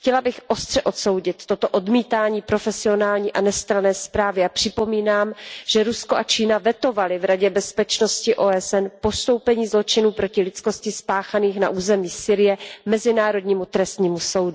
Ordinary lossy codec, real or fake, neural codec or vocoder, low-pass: none; real; none; none